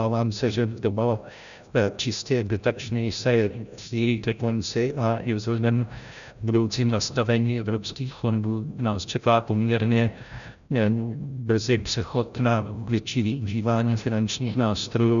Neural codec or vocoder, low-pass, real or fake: codec, 16 kHz, 0.5 kbps, FreqCodec, larger model; 7.2 kHz; fake